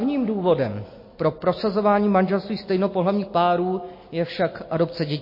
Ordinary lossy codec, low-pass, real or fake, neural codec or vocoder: MP3, 24 kbps; 5.4 kHz; real; none